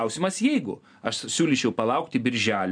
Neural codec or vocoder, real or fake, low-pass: none; real; 9.9 kHz